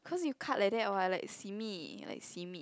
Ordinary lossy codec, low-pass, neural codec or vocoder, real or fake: none; none; none; real